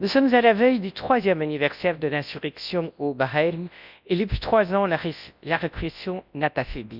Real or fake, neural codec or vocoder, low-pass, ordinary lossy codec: fake; codec, 24 kHz, 0.9 kbps, WavTokenizer, large speech release; 5.4 kHz; none